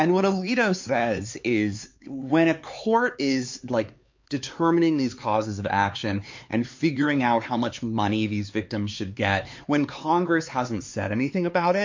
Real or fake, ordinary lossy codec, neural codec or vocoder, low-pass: fake; MP3, 48 kbps; codec, 16 kHz, 4 kbps, X-Codec, HuBERT features, trained on LibriSpeech; 7.2 kHz